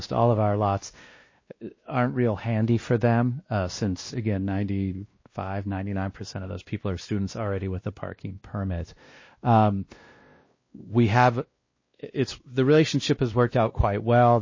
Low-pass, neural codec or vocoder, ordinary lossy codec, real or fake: 7.2 kHz; codec, 16 kHz, 1 kbps, X-Codec, WavLM features, trained on Multilingual LibriSpeech; MP3, 32 kbps; fake